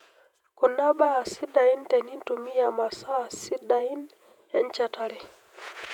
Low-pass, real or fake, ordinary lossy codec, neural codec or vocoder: 19.8 kHz; fake; none; vocoder, 48 kHz, 128 mel bands, Vocos